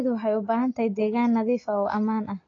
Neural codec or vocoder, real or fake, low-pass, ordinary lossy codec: none; real; 7.2 kHz; AAC, 32 kbps